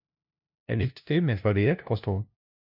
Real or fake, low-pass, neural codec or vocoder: fake; 5.4 kHz; codec, 16 kHz, 0.5 kbps, FunCodec, trained on LibriTTS, 25 frames a second